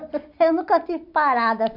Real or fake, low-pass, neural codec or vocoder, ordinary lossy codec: real; 5.4 kHz; none; none